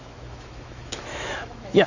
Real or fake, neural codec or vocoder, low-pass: fake; autoencoder, 48 kHz, 128 numbers a frame, DAC-VAE, trained on Japanese speech; 7.2 kHz